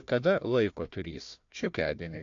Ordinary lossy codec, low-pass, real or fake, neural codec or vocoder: AAC, 48 kbps; 7.2 kHz; fake; codec, 16 kHz, 1 kbps, FunCodec, trained on Chinese and English, 50 frames a second